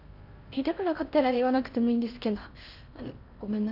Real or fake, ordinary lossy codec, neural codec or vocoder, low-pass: fake; none; codec, 16 kHz in and 24 kHz out, 0.6 kbps, FocalCodec, streaming, 4096 codes; 5.4 kHz